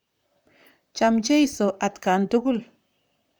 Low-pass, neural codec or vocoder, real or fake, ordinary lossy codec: none; vocoder, 44.1 kHz, 128 mel bands, Pupu-Vocoder; fake; none